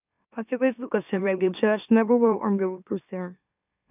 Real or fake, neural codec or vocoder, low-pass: fake; autoencoder, 44.1 kHz, a latent of 192 numbers a frame, MeloTTS; 3.6 kHz